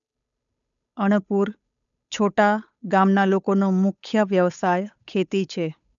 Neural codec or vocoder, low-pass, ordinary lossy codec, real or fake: codec, 16 kHz, 8 kbps, FunCodec, trained on Chinese and English, 25 frames a second; 7.2 kHz; none; fake